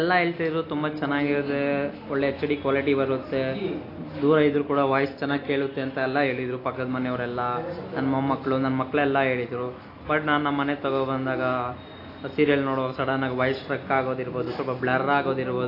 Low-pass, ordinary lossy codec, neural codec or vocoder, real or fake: 5.4 kHz; AAC, 32 kbps; none; real